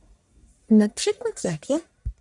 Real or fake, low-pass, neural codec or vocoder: fake; 10.8 kHz; codec, 44.1 kHz, 1.7 kbps, Pupu-Codec